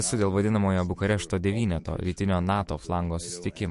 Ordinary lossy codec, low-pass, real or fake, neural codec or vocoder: MP3, 48 kbps; 14.4 kHz; fake; autoencoder, 48 kHz, 128 numbers a frame, DAC-VAE, trained on Japanese speech